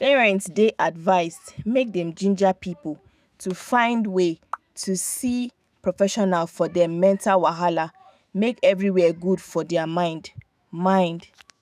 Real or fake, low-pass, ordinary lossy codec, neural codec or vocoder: fake; 14.4 kHz; none; autoencoder, 48 kHz, 128 numbers a frame, DAC-VAE, trained on Japanese speech